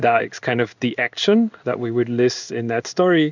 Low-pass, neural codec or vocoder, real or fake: 7.2 kHz; codec, 16 kHz in and 24 kHz out, 1 kbps, XY-Tokenizer; fake